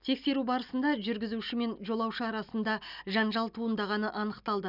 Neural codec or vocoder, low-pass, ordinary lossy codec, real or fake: none; 5.4 kHz; none; real